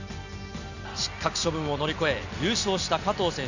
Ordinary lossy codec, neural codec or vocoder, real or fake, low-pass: none; none; real; 7.2 kHz